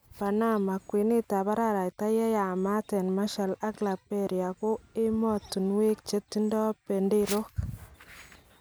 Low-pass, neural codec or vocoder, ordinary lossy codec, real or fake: none; none; none; real